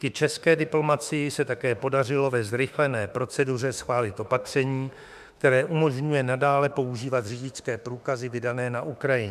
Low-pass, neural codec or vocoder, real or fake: 14.4 kHz; autoencoder, 48 kHz, 32 numbers a frame, DAC-VAE, trained on Japanese speech; fake